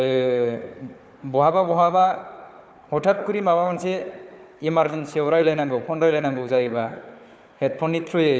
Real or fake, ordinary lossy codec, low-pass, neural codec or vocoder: fake; none; none; codec, 16 kHz, 4 kbps, FunCodec, trained on Chinese and English, 50 frames a second